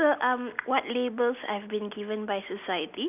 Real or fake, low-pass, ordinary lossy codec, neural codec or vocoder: real; 3.6 kHz; none; none